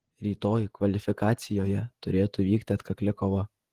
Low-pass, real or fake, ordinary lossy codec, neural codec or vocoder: 14.4 kHz; fake; Opus, 16 kbps; vocoder, 48 kHz, 128 mel bands, Vocos